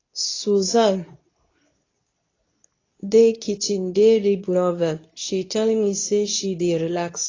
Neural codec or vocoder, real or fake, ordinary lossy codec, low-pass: codec, 24 kHz, 0.9 kbps, WavTokenizer, medium speech release version 2; fake; AAC, 32 kbps; 7.2 kHz